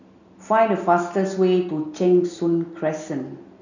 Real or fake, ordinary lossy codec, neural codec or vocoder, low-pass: real; none; none; 7.2 kHz